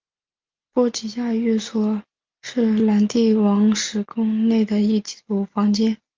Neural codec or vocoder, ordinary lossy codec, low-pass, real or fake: none; Opus, 24 kbps; 7.2 kHz; real